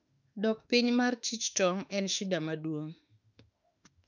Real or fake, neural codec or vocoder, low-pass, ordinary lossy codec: fake; autoencoder, 48 kHz, 32 numbers a frame, DAC-VAE, trained on Japanese speech; 7.2 kHz; none